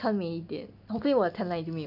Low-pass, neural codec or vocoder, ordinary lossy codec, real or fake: 5.4 kHz; none; none; real